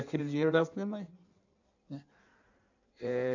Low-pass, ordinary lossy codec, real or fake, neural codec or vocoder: 7.2 kHz; none; fake; codec, 16 kHz in and 24 kHz out, 1.1 kbps, FireRedTTS-2 codec